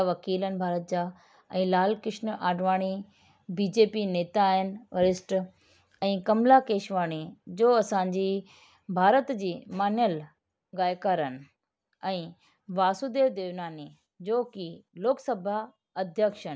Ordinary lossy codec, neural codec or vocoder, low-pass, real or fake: none; none; none; real